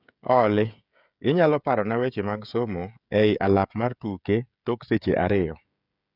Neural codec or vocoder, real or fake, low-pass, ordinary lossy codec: codec, 16 kHz, 16 kbps, FreqCodec, smaller model; fake; 5.4 kHz; none